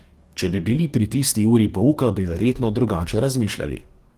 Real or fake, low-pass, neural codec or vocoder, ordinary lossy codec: fake; 14.4 kHz; codec, 44.1 kHz, 2.6 kbps, DAC; Opus, 24 kbps